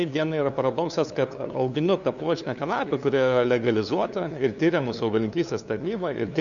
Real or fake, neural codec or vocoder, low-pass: fake; codec, 16 kHz, 2 kbps, FunCodec, trained on LibriTTS, 25 frames a second; 7.2 kHz